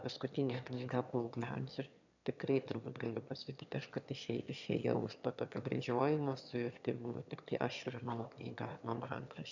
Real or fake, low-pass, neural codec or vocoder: fake; 7.2 kHz; autoencoder, 22.05 kHz, a latent of 192 numbers a frame, VITS, trained on one speaker